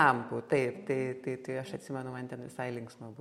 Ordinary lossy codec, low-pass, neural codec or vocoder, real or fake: MP3, 64 kbps; 19.8 kHz; none; real